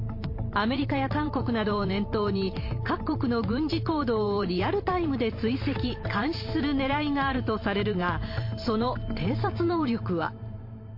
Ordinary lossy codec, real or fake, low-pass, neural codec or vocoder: MP3, 32 kbps; fake; 5.4 kHz; vocoder, 44.1 kHz, 128 mel bands every 512 samples, BigVGAN v2